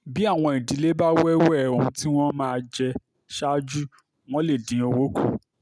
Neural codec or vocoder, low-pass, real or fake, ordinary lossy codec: none; none; real; none